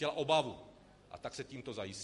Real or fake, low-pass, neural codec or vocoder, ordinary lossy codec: real; 14.4 kHz; none; MP3, 48 kbps